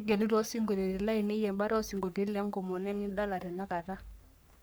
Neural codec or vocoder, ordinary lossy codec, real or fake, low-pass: codec, 44.1 kHz, 3.4 kbps, Pupu-Codec; none; fake; none